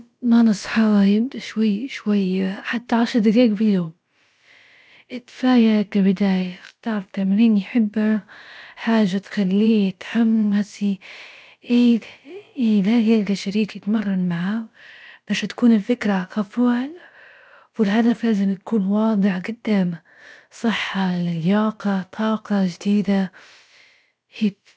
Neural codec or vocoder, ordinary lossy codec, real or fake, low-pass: codec, 16 kHz, about 1 kbps, DyCAST, with the encoder's durations; none; fake; none